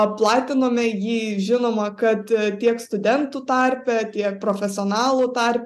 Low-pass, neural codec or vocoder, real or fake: 14.4 kHz; none; real